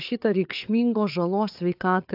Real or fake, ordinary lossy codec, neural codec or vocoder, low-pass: fake; AAC, 48 kbps; codec, 16 kHz, 4 kbps, FreqCodec, larger model; 5.4 kHz